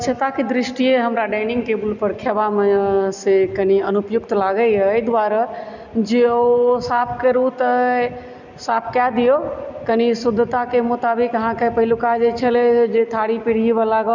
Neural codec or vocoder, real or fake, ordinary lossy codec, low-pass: none; real; none; 7.2 kHz